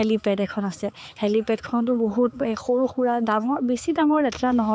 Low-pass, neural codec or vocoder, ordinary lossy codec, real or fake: none; codec, 16 kHz, 4 kbps, X-Codec, HuBERT features, trained on balanced general audio; none; fake